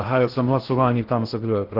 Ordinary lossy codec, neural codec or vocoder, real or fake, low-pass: Opus, 16 kbps; codec, 16 kHz in and 24 kHz out, 0.6 kbps, FocalCodec, streaming, 2048 codes; fake; 5.4 kHz